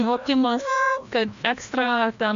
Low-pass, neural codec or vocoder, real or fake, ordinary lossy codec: 7.2 kHz; codec, 16 kHz, 1 kbps, FreqCodec, larger model; fake; MP3, 64 kbps